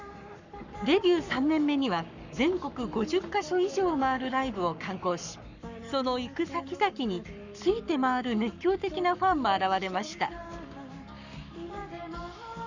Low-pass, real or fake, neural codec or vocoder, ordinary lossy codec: 7.2 kHz; fake; codec, 44.1 kHz, 7.8 kbps, Pupu-Codec; none